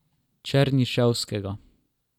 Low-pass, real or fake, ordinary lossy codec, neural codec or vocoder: 19.8 kHz; real; none; none